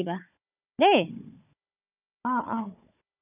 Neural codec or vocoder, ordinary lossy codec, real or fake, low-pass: codec, 16 kHz, 16 kbps, FunCodec, trained on Chinese and English, 50 frames a second; none; fake; 3.6 kHz